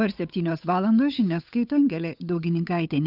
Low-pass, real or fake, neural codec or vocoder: 5.4 kHz; fake; codec, 16 kHz, 8 kbps, FunCodec, trained on Chinese and English, 25 frames a second